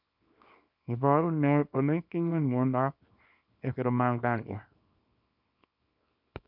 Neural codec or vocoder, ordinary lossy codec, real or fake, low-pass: codec, 24 kHz, 0.9 kbps, WavTokenizer, small release; MP3, 48 kbps; fake; 5.4 kHz